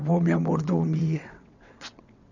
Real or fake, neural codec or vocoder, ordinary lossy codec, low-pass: fake; vocoder, 22.05 kHz, 80 mel bands, WaveNeXt; none; 7.2 kHz